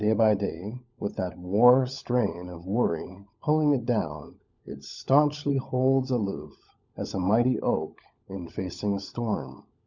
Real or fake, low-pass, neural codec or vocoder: fake; 7.2 kHz; codec, 16 kHz, 16 kbps, FunCodec, trained on LibriTTS, 50 frames a second